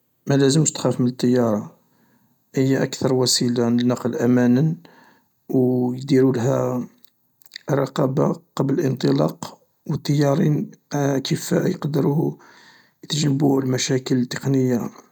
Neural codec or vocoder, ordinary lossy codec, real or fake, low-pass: none; none; real; 19.8 kHz